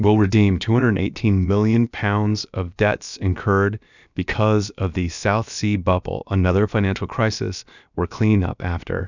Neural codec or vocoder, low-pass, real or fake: codec, 16 kHz, about 1 kbps, DyCAST, with the encoder's durations; 7.2 kHz; fake